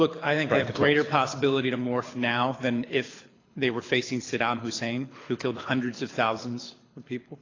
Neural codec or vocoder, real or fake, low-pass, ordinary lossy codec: codec, 24 kHz, 6 kbps, HILCodec; fake; 7.2 kHz; AAC, 32 kbps